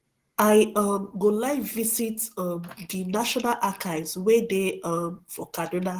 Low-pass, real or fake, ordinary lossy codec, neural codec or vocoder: 14.4 kHz; real; Opus, 16 kbps; none